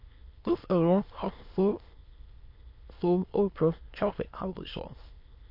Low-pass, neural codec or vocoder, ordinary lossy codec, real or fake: 5.4 kHz; autoencoder, 22.05 kHz, a latent of 192 numbers a frame, VITS, trained on many speakers; MP3, 32 kbps; fake